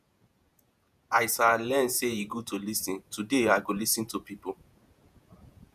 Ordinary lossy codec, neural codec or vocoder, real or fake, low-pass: none; vocoder, 48 kHz, 128 mel bands, Vocos; fake; 14.4 kHz